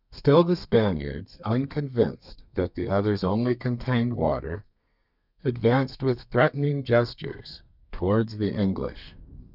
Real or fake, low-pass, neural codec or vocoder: fake; 5.4 kHz; codec, 32 kHz, 1.9 kbps, SNAC